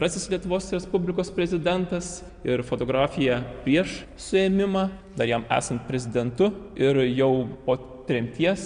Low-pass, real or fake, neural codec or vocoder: 9.9 kHz; real; none